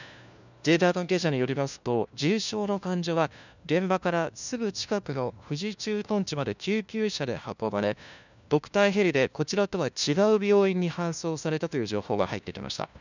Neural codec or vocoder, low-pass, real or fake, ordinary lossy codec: codec, 16 kHz, 1 kbps, FunCodec, trained on LibriTTS, 50 frames a second; 7.2 kHz; fake; none